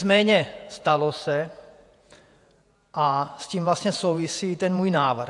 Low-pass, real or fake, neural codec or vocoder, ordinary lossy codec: 10.8 kHz; fake; vocoder, 48 kHz, 128 mel bands, Vocos; AAC, 64 kbps